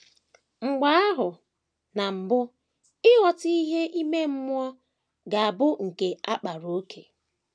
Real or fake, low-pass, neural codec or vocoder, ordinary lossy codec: real; 9.9 kHz; none; none